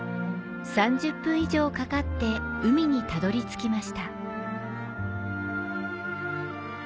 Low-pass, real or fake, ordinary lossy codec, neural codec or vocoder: none; real; none; none